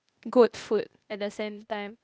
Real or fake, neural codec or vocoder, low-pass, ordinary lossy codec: fake; codec, 16 kHz, 0.8 kbps, ZipCodec; none; none